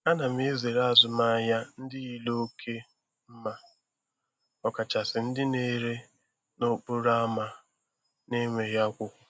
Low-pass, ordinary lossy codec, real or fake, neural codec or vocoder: none; none; real; none